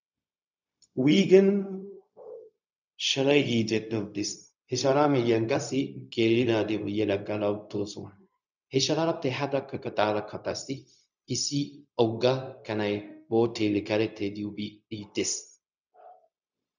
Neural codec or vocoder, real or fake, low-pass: codec, 16 kHz, 0.4 kbps, LongCat-Audio-Codec; fake; 7.2 kHz